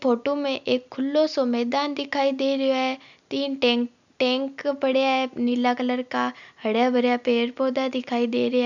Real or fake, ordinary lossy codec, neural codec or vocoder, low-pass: real; none; none; 7.2 kHz